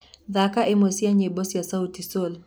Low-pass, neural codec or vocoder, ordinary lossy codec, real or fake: none; none; none; real